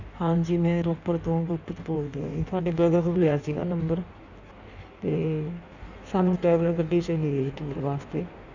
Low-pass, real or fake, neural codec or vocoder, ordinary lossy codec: 7.2 kHz; fake; codec, 16 kHz in and 24 kHz out, 1.1 kbps, FireRedTTS-2 codec; none